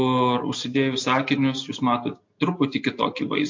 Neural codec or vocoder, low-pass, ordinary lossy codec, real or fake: none; 7.2 kHz; MP3, 48 kbps; real